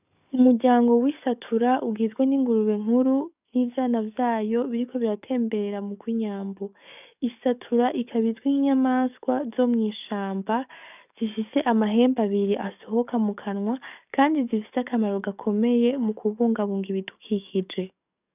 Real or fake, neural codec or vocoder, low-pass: fake; codec, 44.1 kHz, 7.8 kbps, DAC; 3.6 kHz